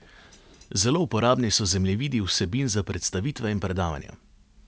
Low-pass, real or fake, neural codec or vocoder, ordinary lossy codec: none; real; none; none